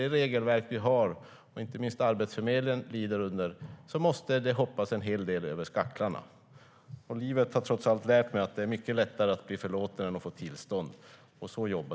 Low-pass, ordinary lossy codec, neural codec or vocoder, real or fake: none; none; none; real